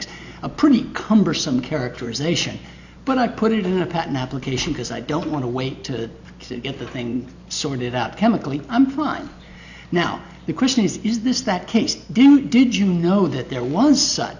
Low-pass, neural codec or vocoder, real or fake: 7.2 kHz; none; real